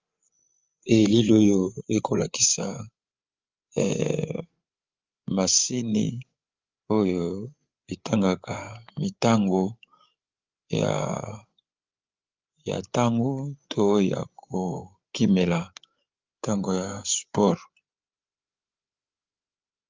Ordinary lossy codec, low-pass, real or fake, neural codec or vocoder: Opus, 32 kbps; 7.2 kHz; fake; codec, 16 kHz, 8 kbps, FreqCodec, larger model